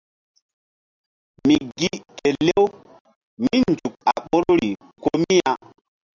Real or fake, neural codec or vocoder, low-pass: real; none; 7.2 kHz